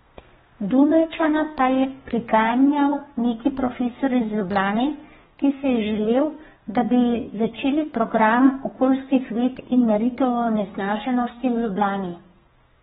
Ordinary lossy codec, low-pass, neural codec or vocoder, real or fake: AAC, 16 kbps; 14.4 kHz; codec, 32 kHz, 1.9 kbps, SNAC; fake